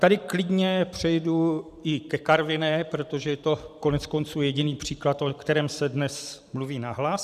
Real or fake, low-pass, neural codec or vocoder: fake; 14.4 kHz; vocoder, 44.1 kHz, 128 mel bands every 512 samples, BigVGAN v2